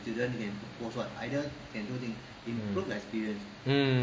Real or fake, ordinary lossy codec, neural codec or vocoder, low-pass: real; none; none; none